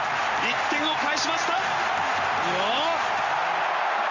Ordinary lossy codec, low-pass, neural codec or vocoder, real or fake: Opus, 32 kbps; 7.2 kHz; none; real